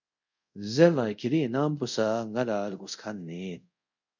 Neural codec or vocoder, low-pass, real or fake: codec, 24 kHz, 0.5 kbps, DualCodec; 7.2 kHz; fake